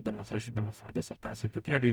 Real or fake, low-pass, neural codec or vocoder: fake; 19.8 kHz; codec, 44.1 kHz, 0.9 kbps, DAC